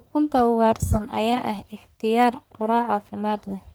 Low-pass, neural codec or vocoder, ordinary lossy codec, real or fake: none; codec, 44.1 kHz, 1.7 kbps, Pupu-Codec; none; fake